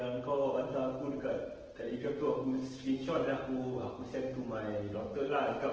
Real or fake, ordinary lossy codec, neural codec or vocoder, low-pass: fake; Opus, 16 kbps; autoencoder, 48 kHz, 128 numbers a frame, DAC-VAE, trained on Japanese speech; 7.2 kHz